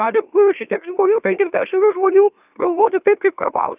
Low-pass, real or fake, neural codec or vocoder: 3.6 kHz; fake; autoencoder, 44.1 kHz, a latent of 192 numbers a frame, MeloTTS